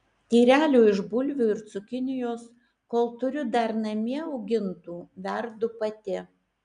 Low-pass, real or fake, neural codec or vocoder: 9.9 kHz; real; none